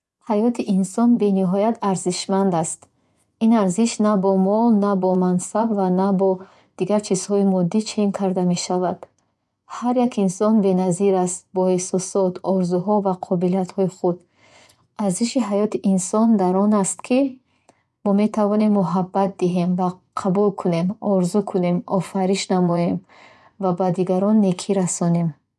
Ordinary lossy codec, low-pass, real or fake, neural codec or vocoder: none; none; fake; vocoder, 24 kHz, 100 mel bands, Vocos